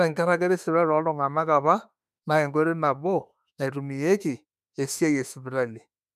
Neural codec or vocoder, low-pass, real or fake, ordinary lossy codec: autoencoder, 48 kHz, 32 numbers a frame, DAC-VAE, trained on Japanese speech; 14.4 kHz; fake; none